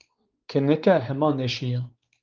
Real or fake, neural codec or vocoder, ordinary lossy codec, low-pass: fake; codec, 16 kHz, 4 kbps, X-Codec, WavLM features, trained on Multilingual LibriSpeech; Opus, 32 kbps; 7.2 kHz